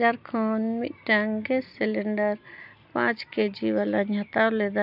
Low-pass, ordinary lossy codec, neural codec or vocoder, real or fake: 5.4 kHz; none; none; real